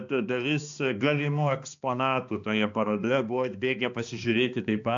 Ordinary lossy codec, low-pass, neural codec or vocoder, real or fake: AAC, 64 kbps; 7.2 kHz; codec, 16 kHz, 2 kbps, X-Codec, HuBERT features, trained on balanced general audio; fake